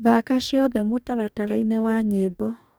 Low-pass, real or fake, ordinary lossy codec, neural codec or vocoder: none; fake; none; codec, 44.1 kHz, 2.6 kbps, DAC